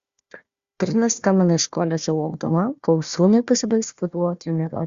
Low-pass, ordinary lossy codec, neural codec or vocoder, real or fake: 7.2 kHz; Opus, 64 kbps; codec, 16 kHz, 1 kbps, FunCodec, trained on Chinese and English, 50 frames a second; fake